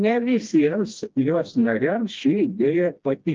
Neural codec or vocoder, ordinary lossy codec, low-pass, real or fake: codec, 16 kHz, 1 kbps, FreqCodec, smaller model; Opus, 24 kbps; 7.2 kHz; fake